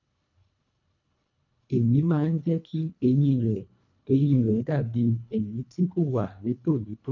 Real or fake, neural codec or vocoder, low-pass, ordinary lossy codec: fake; codec, 24 kHz, 1.5 kbps, HILCodec; 7.2 kHz; none